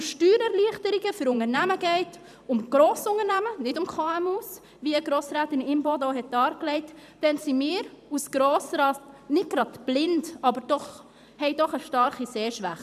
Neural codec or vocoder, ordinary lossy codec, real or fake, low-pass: vocoder, 44.1 kHz, 128 mel bands every 512 samples, BigVGAN v2; none; fake; 14.4 kHz